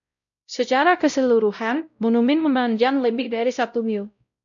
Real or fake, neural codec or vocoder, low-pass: fake; codec, 16 kHz, 0.5 kbps, X-Codec, WavLM features, trained on Multilingual LibriSpeech; 7.2 kHz